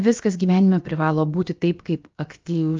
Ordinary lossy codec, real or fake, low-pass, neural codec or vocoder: Opus, 32 kbps; fake; 7.2 kHz; codec, 16 kHz, about 1 kbps, DyCAST, with the encoder's durations